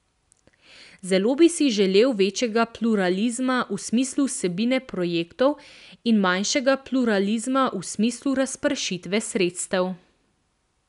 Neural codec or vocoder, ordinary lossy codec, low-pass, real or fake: none; none; 10.8 kHz; real